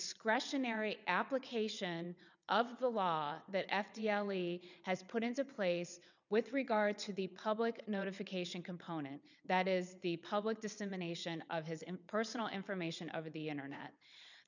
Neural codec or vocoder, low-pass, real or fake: vocoder, 22.05 kHz, 80 mel bands, WaveNeXt; 7.2 kHz; fake